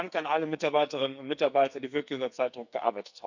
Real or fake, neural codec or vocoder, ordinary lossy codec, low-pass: fake; codec, 44.1 kHz, 2.6 kbps, SNAC; none; 7.2 kHz